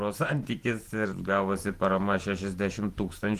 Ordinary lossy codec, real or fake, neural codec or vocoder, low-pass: Opus, 24 kbps; fake; vocoder, 48 kHz, 128 mel bands, Vocos; 14.4 kHz